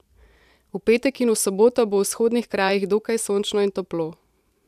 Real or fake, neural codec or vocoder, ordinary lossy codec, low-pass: fake; vocoder, 44.1 kHz, 128 mel bands every 512 samples, BigVGAN v2; none; 14.4 kHz